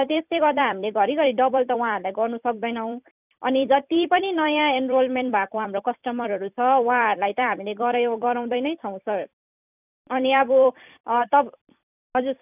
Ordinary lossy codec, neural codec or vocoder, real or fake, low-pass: none; vocoder, 44.1 kHz, 128 mel bands every 256 samples, BigVGAN v2; fake; 3.6 kHz